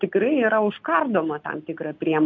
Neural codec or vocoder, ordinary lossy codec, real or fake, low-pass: none; MP3, 48 kbps; real; 7.2 kHz